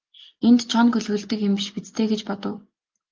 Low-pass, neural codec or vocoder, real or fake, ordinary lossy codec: 7.2 kHz; none; real; Opus, 24 kbps